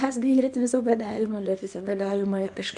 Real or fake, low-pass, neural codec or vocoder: fake; 10.8 kHz; codec, 24 kHz, 0.9 kbps, WavTokenizer, small release